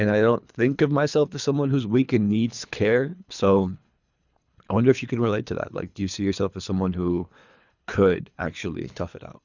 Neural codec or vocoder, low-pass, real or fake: codec, 24 kHz, 3 kbps, HILCodec; 7.2 kHz; fake